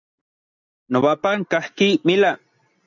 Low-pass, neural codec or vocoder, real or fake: 7.2 kHz; none; real